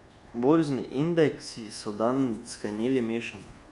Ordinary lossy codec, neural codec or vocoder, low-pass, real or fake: Opus, 64 kbps; codec, 24 kHz, 1.2 kbps, DualCodec; 10.8 kHz; fake